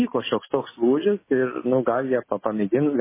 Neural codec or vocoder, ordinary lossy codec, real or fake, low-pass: none; MP3, 16 kbps; real; 3.6 kHz